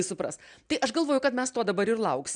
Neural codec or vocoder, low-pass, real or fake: none; 9.9 kHz; real